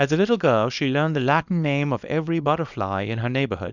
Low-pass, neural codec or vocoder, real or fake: 7.2 kHz; codec, 24 kHz, 0.9 kbps, WavTokenizer, small release; fake